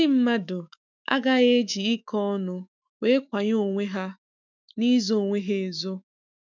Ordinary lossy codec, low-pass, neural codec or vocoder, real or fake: none; 7.2 kHz; autoencoder, 48 kHz, 128 numbers a frame, DAC-VAE, trained on Japanese speech; fake